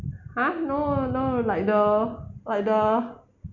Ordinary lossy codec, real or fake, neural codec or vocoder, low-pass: MP3, 64 kbps; real; none; 7.2 kHz